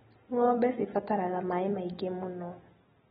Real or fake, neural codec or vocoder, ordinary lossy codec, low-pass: real; none; AAC, 16 kbps; 10.8 kHz